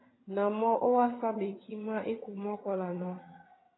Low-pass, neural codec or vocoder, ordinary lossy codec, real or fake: 7.2 kHz; vocoder, 22.05 kHz, 80 mel bands, HiFi-GAN; AAC, 16 kbps; fake